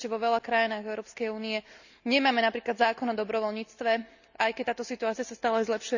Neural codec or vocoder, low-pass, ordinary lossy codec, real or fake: none; 7.2 kHz; none; real